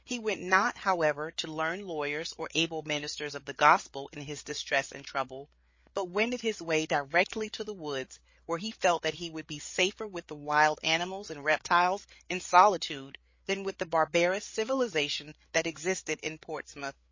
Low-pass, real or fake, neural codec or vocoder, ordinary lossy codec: 7.2 kHz; fake; codec, 16 kHz, 8 kbps, FreqCodec, larger model; MP3, 32 kbps